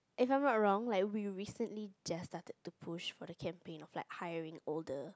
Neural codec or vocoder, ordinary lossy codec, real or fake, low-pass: none; none; real; none